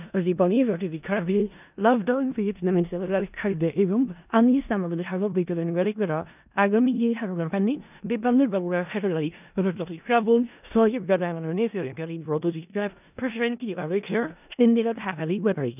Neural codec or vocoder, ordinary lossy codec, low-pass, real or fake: codec, 16 kHz in and 24 kHz out, 0.4 kbps, LongCat-Audio-Codec, four codebook decoder; none; 3.6 kHz; fake